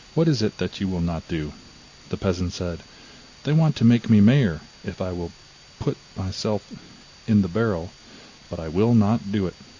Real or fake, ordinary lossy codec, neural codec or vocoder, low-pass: real; AAC, 48 kbps; none; 7.2 kHz